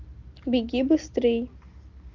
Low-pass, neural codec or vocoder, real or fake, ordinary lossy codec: 7.2 kHz; none; real; Opus, 24 kbps